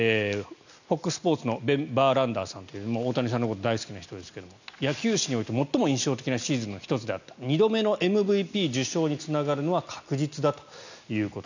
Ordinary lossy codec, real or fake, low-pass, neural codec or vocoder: none; real; 7.2 kHz; none